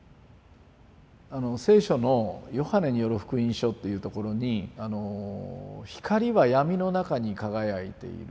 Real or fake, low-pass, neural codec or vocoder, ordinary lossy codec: real; none; none; none